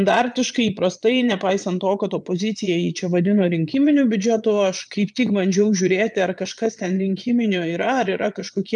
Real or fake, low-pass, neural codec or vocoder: fake; 9.9 kHz; vocoder, 22.05 kHz, 80 mel bands, Vocos